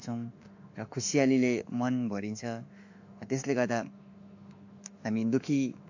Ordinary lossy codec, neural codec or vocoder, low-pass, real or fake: AAC, 48 kbps; autoencoder, 48 kHz, 32 numbers a frame, DAC-VAE, trained on Japanese speech; 7.2 kHz; fake